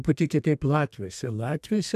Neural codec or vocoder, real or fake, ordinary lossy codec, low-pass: codec, 32 kHz, 1.9 kbps, SNAC; fake; AAC, 96 kbps; 14.4 kHz